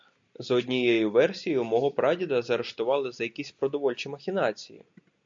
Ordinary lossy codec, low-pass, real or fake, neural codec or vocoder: MP3, 48 kbps; 7.2 kHz; real; none